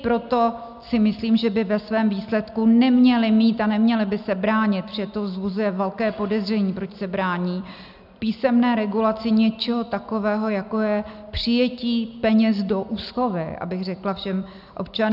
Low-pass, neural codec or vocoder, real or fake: 5.4 kHz; none; real